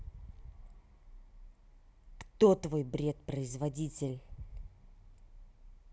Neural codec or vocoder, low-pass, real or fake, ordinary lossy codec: none; none; real; none